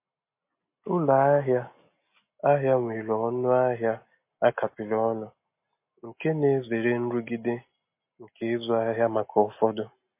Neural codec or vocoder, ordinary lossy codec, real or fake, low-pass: none; MP3, 24 kbps; real; 3.6 kHz